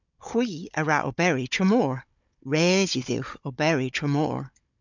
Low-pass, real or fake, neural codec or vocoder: 7.2 kHz; fake; codec, 16 kHz, 16 kbps, FunCodec, trained on Chinese and English, 50 frames a second